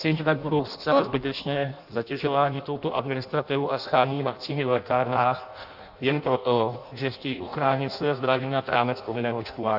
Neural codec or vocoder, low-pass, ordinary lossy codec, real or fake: codec, 16 kHz in and 24 kHz out, 0.6 kbps, FireRedTTS-2 codec; 5.4 kHz; AAC, 48 kbps; fake